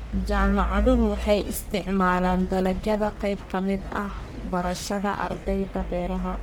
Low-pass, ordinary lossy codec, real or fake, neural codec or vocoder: none; none; fake; codec, 44.1 kHz, 1.7 kbps, Pupu-Codec